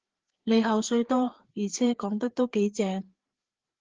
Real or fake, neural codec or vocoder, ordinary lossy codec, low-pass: fake; codec, 16 kHz, 4 kbps, FreqCodec, larger model; Opus, 16 kbps; 7.2 kHz